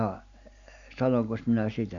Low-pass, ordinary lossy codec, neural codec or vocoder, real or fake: 7.2 kHz; none; none; real